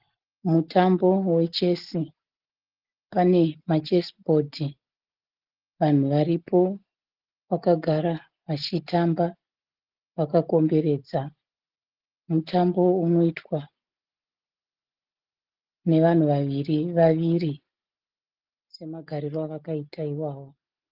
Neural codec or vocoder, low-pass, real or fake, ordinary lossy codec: none; 5.4 kHz; real; Opus, 16 kbps